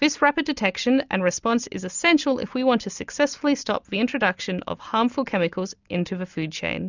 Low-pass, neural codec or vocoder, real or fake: 7.2 kHz; none; real